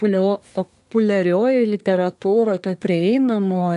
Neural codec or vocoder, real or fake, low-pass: codec, 24 kHz, 1 kbps, SNAC; fake; 10.8 kHz